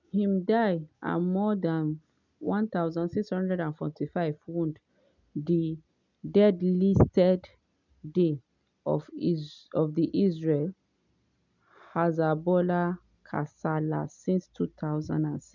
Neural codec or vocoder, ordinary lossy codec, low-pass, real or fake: none; none; 7.2 kHz; real